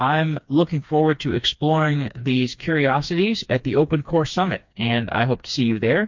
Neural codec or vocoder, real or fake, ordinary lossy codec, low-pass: codec, 16 kHz, 2 kbps, FreqCodec, smaller model; fake; MP3, 48 kbps; 7.2 kHz